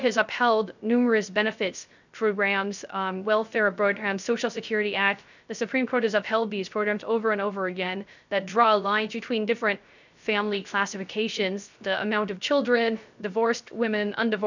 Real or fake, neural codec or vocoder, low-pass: fake; codec, 16 kHz, 0.3 kbps, FocalCodec; 7.2 kHz